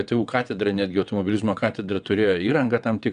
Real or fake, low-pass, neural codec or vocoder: fake; 9.9 kHz; vocoder, 22.05 kHz, 80 mel bands, WaveNeXt